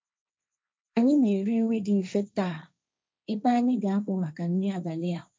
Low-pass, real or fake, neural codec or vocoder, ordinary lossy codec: none; fake; codec, 16 kHz, 1.1 kbps, Voila-Tokenizer; none